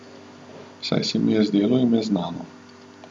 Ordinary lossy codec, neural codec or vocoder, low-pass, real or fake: none; none; none; real